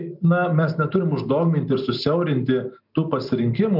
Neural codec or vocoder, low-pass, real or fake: none; 5.4 kHz; real